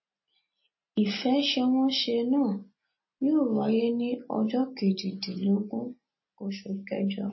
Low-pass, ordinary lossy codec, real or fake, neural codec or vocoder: 7.2 kHz; MP3, 24 kbps; real; none